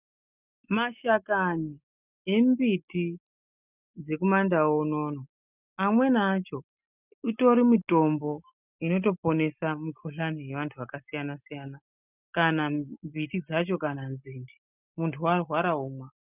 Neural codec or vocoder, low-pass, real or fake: none; 3.6 kHz; real